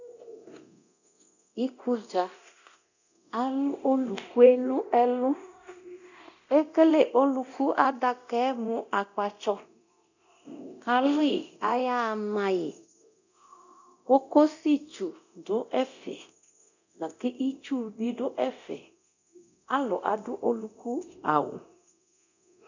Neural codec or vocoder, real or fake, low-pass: codec, 24 kHz, 0.9 kbps, DualCodec; fake; 7.2 kHz